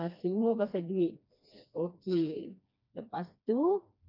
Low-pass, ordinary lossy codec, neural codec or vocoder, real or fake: 5.4 kHz; none; codec, 16 kHz, 2 kbps, FreqCodec, smaller model; fake